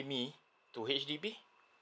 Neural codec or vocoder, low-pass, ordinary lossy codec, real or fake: none; none; none; real